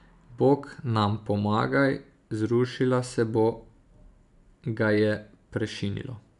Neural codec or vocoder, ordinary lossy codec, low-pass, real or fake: none; none; 10.8 kHz; real